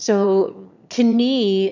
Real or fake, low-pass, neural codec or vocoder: fake; 7.2 kHz; autoencoder, 22.05 kHz, a latent of 192 numbers a frame, VITS, trained on one speaker